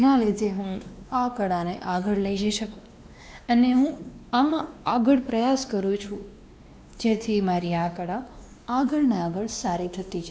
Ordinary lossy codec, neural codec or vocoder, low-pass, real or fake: none; codec, 16 kHz, 2 kbps, X-Codec, WavLM features, trained on Multilingual LibriSpeech; none; fake